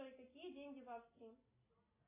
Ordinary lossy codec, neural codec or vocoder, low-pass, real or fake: MP3, 16 kbps; none; 3.6 kHz; real